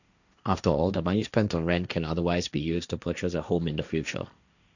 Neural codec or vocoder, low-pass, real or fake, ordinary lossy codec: codec, 16 kHz, 1.1 kbps, Voila-Tokenizer; 7.2 kHz; fake; none